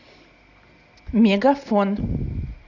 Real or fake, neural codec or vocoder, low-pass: real; none; 7.2 kHz